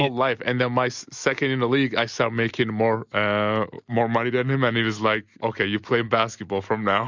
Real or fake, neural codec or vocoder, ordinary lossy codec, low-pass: real; none; Opus, 64 kbps; 7.2 kHz